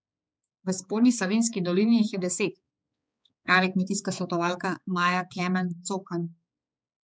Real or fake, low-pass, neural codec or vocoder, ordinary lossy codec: fake; none; codec, 16 kHz, 4 kbps, X-Codec, HuBERT features, trained on balanced general audio; none